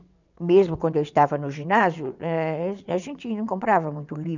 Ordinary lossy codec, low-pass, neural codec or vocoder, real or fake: none; 7.2 kHz; vocoder, 22.05 kHz, 80 mel bands, WaveNeXt; fake